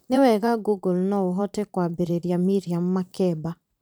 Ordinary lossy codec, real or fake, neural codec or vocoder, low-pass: none; fake; vocoder, 44.1 kHz, 128 mel bands every 512 samples, BigVGAN v2; none